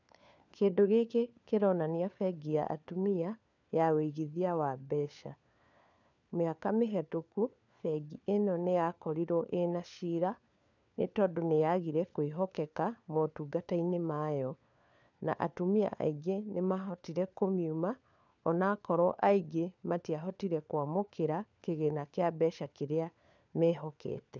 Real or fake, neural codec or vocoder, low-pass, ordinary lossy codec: fake; codec, 16 kHz, 4 kbps, FunCodec, trained on LibriTTS, 50 frames a second; 7.2 kHz; none